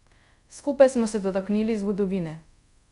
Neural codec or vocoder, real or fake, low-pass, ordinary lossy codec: codec, 24 kHz, 0.5 kbps, DualCodec; fake; 10.8 kHz; none